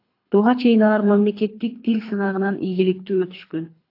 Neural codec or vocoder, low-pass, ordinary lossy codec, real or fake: codec, 24 kHz, 3 kbps, HILCodec; 5.4 kHz; AAC, 32 kbps; fake